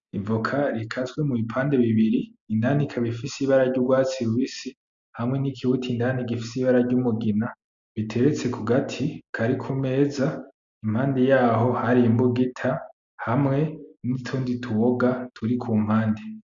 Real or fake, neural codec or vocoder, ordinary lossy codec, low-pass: real; none; MP3, 64 kbps; 7.2 kHz